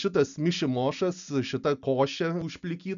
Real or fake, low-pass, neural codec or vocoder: real; 7.2 kHz; none